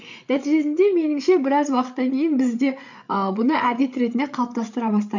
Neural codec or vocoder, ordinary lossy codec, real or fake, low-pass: codec, 16 kHz, 8 kbps, FreqCodec, larger model; none; fake; 7.2 kHz